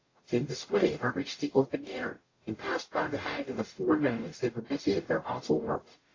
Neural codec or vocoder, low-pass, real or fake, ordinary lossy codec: codec, 44.1 kHz, 0.9 kbps, DAC; 7.2 kHz; fake; AAC, 32 kbps